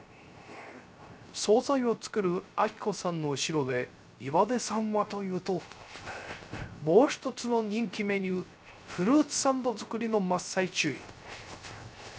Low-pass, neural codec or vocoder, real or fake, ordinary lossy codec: none; codec, 16 kHz, 0.3 kbps, FocalCodec; fake; none